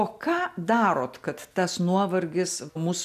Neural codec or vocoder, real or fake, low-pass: none; real; 14.4 kHz